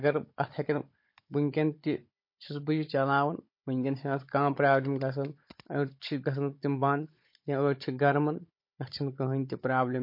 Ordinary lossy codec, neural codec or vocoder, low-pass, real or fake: MP3, 32 kbps; codec, 16 kHz, 16 kbps, FunCodec, trained on Chinese and English, 50 frames a second; 5.4 kHz; fake